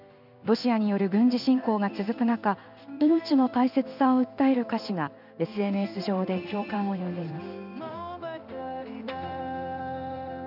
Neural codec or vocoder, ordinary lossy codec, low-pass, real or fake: codec, 16 kHz in and 24 kHz out, 1 kbps, XY-Tokenizer; none; 5.4 kHz; fake